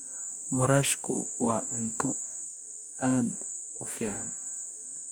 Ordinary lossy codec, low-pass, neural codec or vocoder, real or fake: none; none; codec, 44.1 kHz, 2.6 kbps, DAC; fake